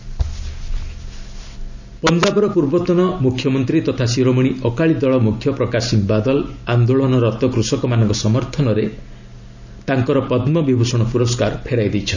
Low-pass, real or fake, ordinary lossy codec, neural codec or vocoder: 7.2 kHz; real; none; none